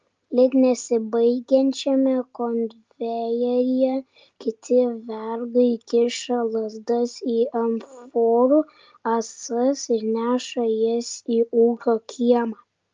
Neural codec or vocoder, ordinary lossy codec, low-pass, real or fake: none; Opus, 24 kbps; 7.2 kHz; real